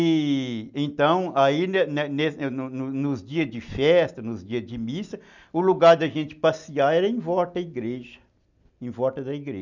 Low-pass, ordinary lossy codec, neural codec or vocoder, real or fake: 7.2 kHz; none; none; real